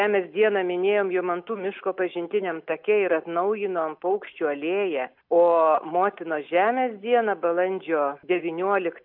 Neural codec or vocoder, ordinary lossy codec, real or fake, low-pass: none; AAC, 48 kbps; real; 5.4 kHz